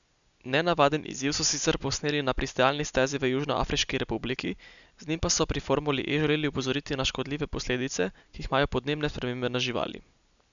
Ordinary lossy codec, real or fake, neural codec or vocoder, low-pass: none; real; none; 7.2 kHz